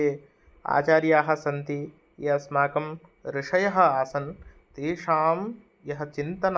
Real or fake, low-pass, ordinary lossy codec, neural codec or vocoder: real; none; none; none